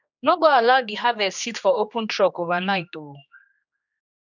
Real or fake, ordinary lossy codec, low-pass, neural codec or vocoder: fake; none; 7.2 kHz; codec, 16 kHz, 2 kbps, X-Codec, HuBERT features, trained on general audio